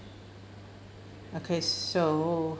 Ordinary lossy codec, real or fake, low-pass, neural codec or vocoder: none; real; none; none